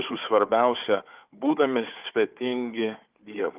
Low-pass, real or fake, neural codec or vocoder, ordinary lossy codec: 3.6 kHz; fake; codec, 16 kHz, 4 kbps, FunCodec, trained on Chinese and English, 50 frames a second; Opus, 32 kbps